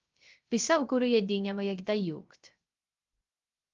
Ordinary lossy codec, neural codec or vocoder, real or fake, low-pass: Opus, 32 kbps; codec, 16 kHz, 0.3 kbps, FocalCodec; fake; 7.2 kHz